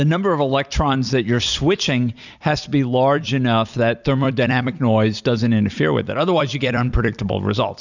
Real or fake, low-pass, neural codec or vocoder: fake; 7.2 kHz; vocoder, 44.1 kHz, 128 mel bands every 256 samples, BigVGAN v2